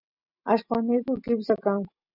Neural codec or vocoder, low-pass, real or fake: none; 5.4 kHz; real